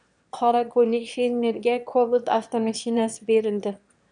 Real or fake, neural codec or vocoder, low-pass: fake; autoencoder, 22.05 kHz, a latent of 192 numbers a frame, VITS, trained on one speaker; 9.9 kHz